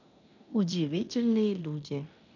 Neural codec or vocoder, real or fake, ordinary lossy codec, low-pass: codec, 16 kHz in and 24 kHz out, 0.9 kbps, LongCat-Audio-Codec, fine tuned four codebook decoder; fake; none; 7.2 kHz